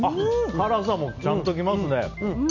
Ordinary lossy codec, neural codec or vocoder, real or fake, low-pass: none; none; real; 7.2 kHz